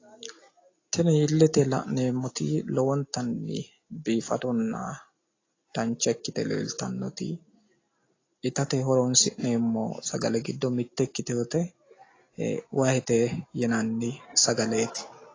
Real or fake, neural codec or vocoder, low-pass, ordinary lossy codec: real; none; 7.2 kHz; AAC, 32 kbps